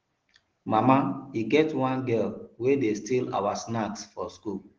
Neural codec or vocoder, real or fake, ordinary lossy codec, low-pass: none; real; Opus, 16 kbps; 7.2 kHz